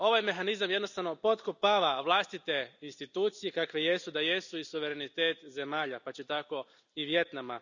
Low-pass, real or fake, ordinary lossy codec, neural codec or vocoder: 7.2 kHz; real; none; none